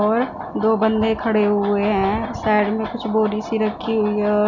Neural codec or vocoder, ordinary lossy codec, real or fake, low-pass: none; none; real; 7.2 kHz